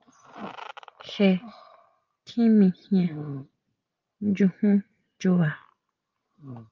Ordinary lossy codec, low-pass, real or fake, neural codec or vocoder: Opus, 24 kbps; 7.2 kHz; real; none